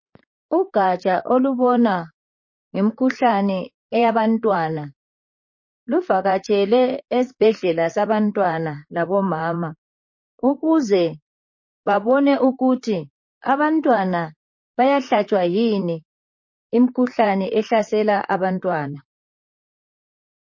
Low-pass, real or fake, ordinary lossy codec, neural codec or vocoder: 7.2 kHz; fake; MP3, 32 kbps; vocoder, 44.1 kHz, 128 mel bands, Pupu-Vocoder